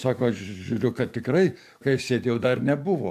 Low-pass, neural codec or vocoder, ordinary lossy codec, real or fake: 14.4 kHz; codec, 44.1 kHz, 7.8 kbps, DAC; AAC, 96 kbps; fake